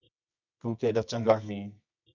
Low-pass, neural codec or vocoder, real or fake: 7.2 kHz; codec, 24 kHz, 0.9 kbps, WavTokenizer, medium music audio release; fake